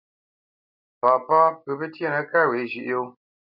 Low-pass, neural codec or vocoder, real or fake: 5.4 kHz; none; real